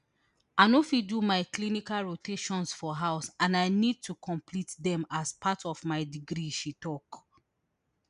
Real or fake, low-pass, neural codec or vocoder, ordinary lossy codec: real; 10.8 kHz; none; none